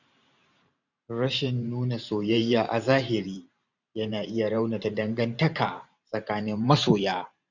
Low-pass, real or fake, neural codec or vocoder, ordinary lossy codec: 7.2 kHz; fake; vocoder, 44.1 kHz, 128 mel bands every 512 samples, BigVGAN v2; none